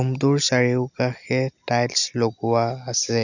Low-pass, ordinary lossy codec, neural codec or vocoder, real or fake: 7.2 kHz; none; none; real